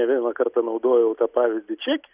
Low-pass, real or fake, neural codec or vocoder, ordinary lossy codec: 3.6 kHz; real; none; Opus, 64 kbps